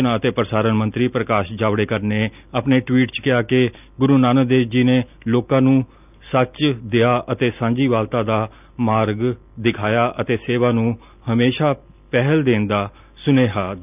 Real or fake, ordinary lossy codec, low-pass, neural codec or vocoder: real; none; 3.6 kHz; none